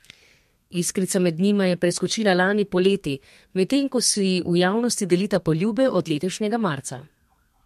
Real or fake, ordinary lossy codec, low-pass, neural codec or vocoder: fake; MP3, 64 kbps; 14.4 kHz; codec, 32 kHz, 1.9 kbps, SNAC